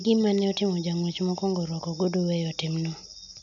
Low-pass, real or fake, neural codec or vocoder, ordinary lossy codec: 7.2 kHz; real; none; none